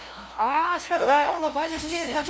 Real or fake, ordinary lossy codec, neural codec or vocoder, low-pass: fake; none; codec, 16 kHz, 0.5 kbps, FunCodec, trained on LibriTTS, 25 frames a second; none